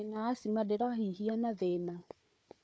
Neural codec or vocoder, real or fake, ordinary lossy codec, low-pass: codec, 16 kHz, 4 kbps, FunCodec, trained on Chinese and English, 50 frames a second; fake; none; none